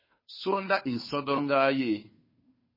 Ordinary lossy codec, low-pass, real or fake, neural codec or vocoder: MP3, 24 kbps; 5.4 kHz; fake; codec, 16 kHz, 2 kbps, FunCodec, trained on Chinese and English, 25 frames a second